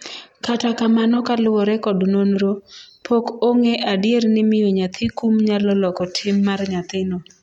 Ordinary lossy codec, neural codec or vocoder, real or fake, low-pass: MP3, 64 kbps; none; real; 19.8 kHz